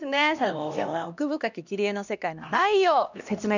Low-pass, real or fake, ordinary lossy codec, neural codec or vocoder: 7.2 kHz; fake; none; codec, 16 kHz, 1 kbps, X-Codec, HuBERT features, trained on LibriSpeech